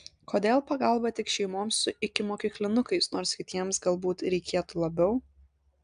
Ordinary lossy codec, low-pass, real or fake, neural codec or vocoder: AAC, 96 kbps; 9.9 kHz; real; none